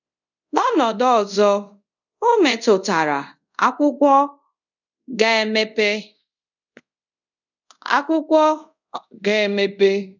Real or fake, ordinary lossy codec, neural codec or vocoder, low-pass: fake; none; codec, 24 kHz, 0.5 kbps, DualCodec; 7.2 kHz